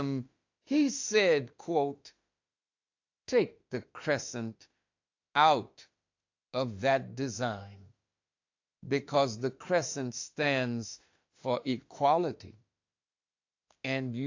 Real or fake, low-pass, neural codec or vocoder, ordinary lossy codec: fake; 7.2 kHz; autoencoder, 48 kHz, 32 numbers a frame, DAC-VAE, trained on Japanese speech; AAC, 48 kbps